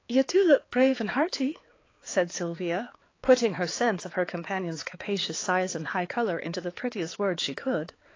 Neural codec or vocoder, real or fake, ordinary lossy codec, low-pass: codec, 16 kHz, 4 kbps, X-Codec, HuBERT features, trained on balanced general audio; fake; AAC, 32 kbps; 7.2 kHz